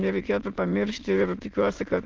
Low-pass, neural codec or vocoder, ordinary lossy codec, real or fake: 7.2 kHz; autoencoder, 22.05 kHz, a latent of 192 numbers a frame, VITS, trained on many speakers; Opus, 24 kbps; fake